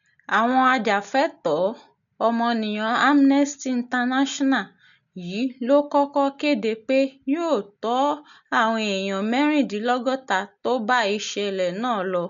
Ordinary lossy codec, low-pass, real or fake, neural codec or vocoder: none; 7.2 kHz; real; none